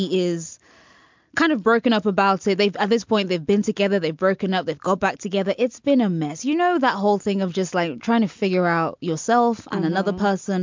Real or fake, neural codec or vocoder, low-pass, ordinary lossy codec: real; none; 7.2 kHz; MP3, 64 kbps